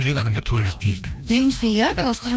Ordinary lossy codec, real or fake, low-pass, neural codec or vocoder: none; fake; none; codec, 16 kHz, 1 kbps, FreqCodec, larger model